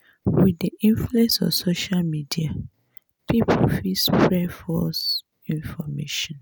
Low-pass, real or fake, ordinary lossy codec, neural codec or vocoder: none; real; none; none